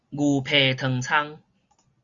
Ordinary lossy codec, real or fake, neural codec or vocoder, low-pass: Opus, 64 kbps; real; none; 7.2 kHz